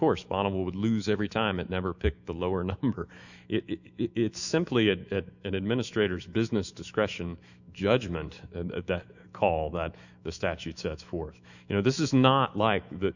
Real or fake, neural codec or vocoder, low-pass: fake; autoencoder, 48 kHz, 128 numbers a frame, DAC-VAE, trained on Japanese speech; 7.2 kHz